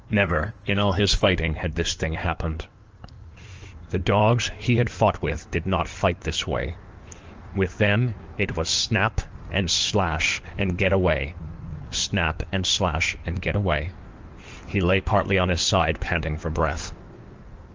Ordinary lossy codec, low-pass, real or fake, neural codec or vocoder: Opus, 24 kbps; 7.2 kHz; fake; codec, 16 kHz in and 24 kHz out, 2.2 kbps, FireRedTTS-2 codec